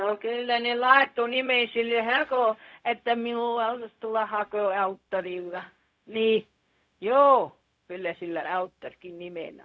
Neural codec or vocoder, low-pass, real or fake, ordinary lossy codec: codec, 16 kHz, 0.4 kbps, LongCat-Audio-Codec; none; fake; none